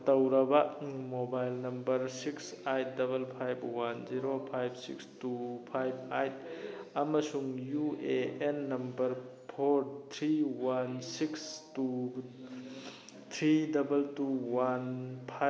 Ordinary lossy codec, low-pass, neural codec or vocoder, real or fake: none; none; none; real